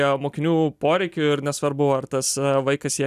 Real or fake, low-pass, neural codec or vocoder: real; 14.4 kHz; none